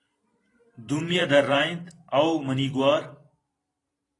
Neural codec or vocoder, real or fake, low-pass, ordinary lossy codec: vocoder, 44.1 kHz, 128 mel bands every 512 samples, BigVGAN v2; fake; 10.8 kHz; AAC, 32 kbps